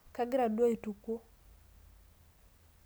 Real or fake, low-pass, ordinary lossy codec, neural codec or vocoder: real; none; none; none